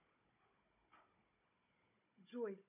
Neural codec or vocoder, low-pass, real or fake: codec, 16 kHz, 16 kbps, FunCodec, trained on Chinese and English, 50 frames a second; 3.6 kHz; fake